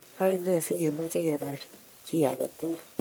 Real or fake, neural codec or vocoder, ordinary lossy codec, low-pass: fake; codec, 44.1 kHz, 1.7 kbps, Pupu-Codec; none; none